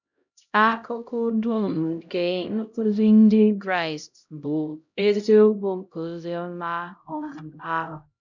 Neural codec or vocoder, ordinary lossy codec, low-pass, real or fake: codec, 16 kHz, 0.5 kbps, X-Codec, HuBERT features, trained on LibriSpeech; none; 7.2 kHz; fake